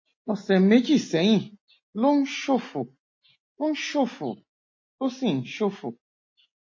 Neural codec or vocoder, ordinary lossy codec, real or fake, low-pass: none; MP3, 32 kbps; real; 7.2 kHz